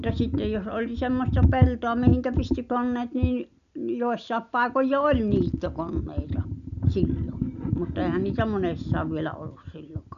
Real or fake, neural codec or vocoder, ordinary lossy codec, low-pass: real; none; none; 7.2 kHz